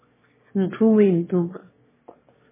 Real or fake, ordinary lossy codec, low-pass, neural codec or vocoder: fake; MP3, 16 kbps; 3.6 kHz; autoencoder, 22.05 kHz, a latent of 192 numbers a frame, VITS, trained on one speaker